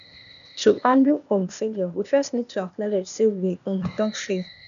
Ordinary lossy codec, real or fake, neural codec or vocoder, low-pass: none; fake; codec, 16 kHz, 0.8 kbps, ZipCodec; 7.2 kHz